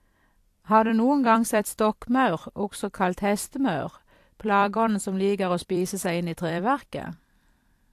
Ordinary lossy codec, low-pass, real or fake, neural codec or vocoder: AAC, 64 kbps; 14.4 kHz; fake; vocoder, 44.1 kHz, 128 mel bands every 256 samples, BigVGAN v2